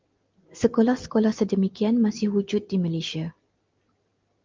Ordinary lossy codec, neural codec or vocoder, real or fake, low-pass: Opus, 32 kbps; none; real; 7.2 kHz